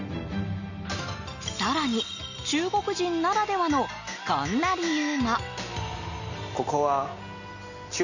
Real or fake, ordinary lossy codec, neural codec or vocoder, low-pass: real; none; none; 7.2 kHz